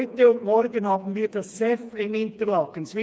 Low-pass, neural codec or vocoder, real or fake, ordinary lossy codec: none; codec, 16 kHz, 2 kbps, FreqCodec, smaller model; fake; none